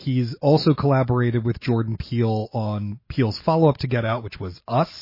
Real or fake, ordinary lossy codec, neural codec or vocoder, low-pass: real; MP3, 24 kbps; none; 5.4 kHz